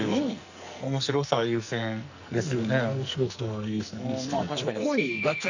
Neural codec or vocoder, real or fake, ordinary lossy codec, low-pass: codec, 44.1 kHz, 2.6 kbps, SNAC; fake; none; 7.2 kHz